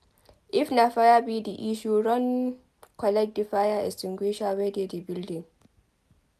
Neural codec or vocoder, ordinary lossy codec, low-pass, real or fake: none; none; 14.4 kHz; real